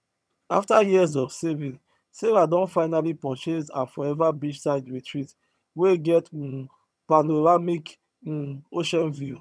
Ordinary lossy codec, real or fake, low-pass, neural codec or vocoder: none; fake; none; vocoder, 22.05 kHz, 80 mel bands, HiFi-GAN